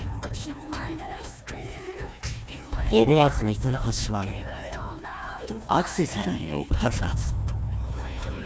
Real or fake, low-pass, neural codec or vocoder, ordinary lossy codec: fake; none; codec, 16 kHz, 1 kbps, FunCodec, trained on Chinese and English, 50 frames a second; none